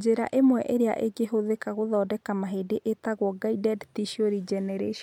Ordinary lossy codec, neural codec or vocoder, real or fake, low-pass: MP3, 96 kbps; none; real; 19.8 kHz